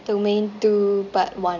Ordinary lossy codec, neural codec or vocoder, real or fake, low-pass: none; none; real; 7.2 kHz